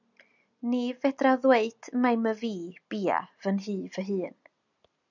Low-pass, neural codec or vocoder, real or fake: 7.2 kHz; none; real